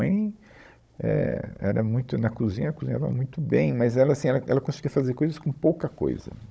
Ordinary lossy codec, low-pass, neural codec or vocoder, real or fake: none; none; codec, 16 kHz, 16 kbps, FunCodec, trained on Chinese and English, 50 frames a second; fake